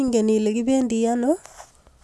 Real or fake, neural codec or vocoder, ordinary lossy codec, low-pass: real; none; none; none